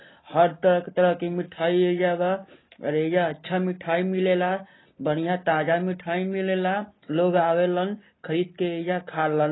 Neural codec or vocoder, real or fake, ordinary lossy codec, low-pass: none; real; AAC, 16 kbps; 7.2 kHz